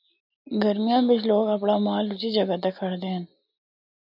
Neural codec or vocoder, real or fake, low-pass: none; real; 5.4 kHz